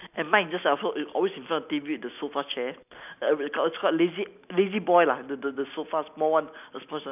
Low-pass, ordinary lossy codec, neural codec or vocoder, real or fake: 3.6 kHz; none; none; real